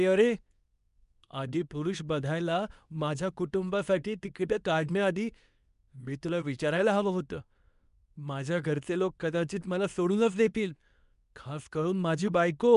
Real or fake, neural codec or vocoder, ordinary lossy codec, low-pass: fake; codec, 24 kHz, 0.9 kbps, WavTokenizer, medium speech release version 2; none; 10.8 kHz